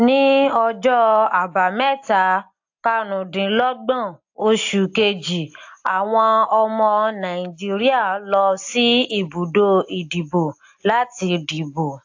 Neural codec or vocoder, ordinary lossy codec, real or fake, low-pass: none; AAC, 48 kbps; real; 7.2 kHz